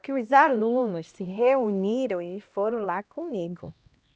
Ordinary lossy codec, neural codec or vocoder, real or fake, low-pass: none; codec, 16 kHz, 1 kbps, X-Codec, HuBERT features, trained on LibriSpeech; fake; none